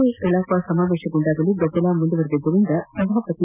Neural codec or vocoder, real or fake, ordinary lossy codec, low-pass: none; real; none; 3.6 kHz